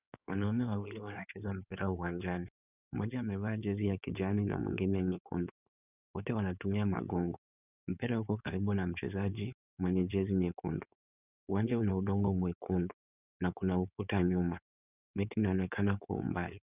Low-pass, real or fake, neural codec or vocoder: 3.6 kHz; fake; codec, 16 kHz in and 24 kHz out, 2.2 kbps, FireRedTTS-2 codec